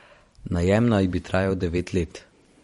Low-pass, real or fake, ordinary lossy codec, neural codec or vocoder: 19.8 kHz; fake; MP3, 48 kbps; vocoder, 44.1 kHz, 128 mel bands every 512 samples, BigVGAN v2